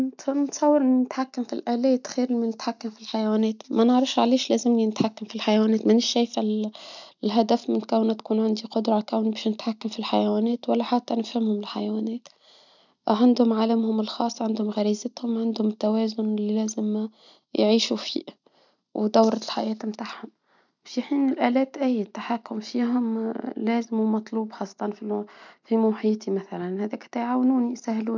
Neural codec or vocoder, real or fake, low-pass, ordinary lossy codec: none; real; 7.2 kHz; none